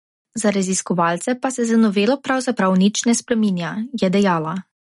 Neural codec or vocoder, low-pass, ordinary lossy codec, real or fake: none; 19.8 kHz; MP3, 48 kbps; real